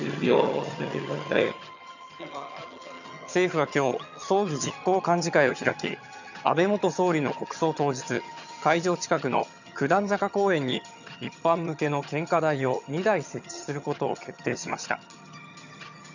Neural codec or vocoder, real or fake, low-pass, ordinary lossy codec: vocoder, 22.05 kHz, 80 mel bands, HiFi-GAN; fake; 7.2 kHz; none